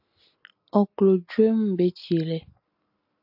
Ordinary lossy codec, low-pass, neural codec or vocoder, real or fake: AAC, 48 kbps; 5.4 kHz; none; real